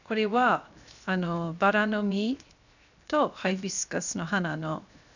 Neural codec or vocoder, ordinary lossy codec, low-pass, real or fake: codec, 16 kHz, 0.7 kbps, FocalCodec; none; 7.2 kHz; fake